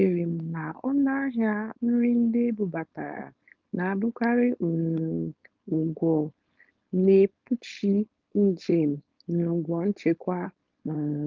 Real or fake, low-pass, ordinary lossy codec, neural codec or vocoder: fake; 7.2 kHz; Opus, 24 kbps; codec, 16 kHz, 4.8 kbps, FACodec